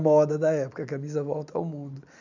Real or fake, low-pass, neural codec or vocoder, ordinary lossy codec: real; 7.2 kHz; none; none